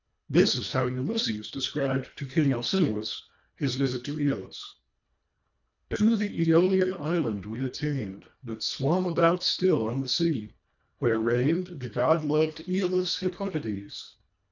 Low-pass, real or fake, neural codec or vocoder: 7.2 kHz; fake; codec, 24 kHz, 1.5 kbps, HILCodec